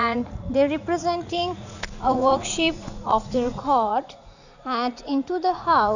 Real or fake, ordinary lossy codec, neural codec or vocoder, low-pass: fake; none; vocoder, 44.1 kHz, 80 mel bands, Vocos; 7.2 kHz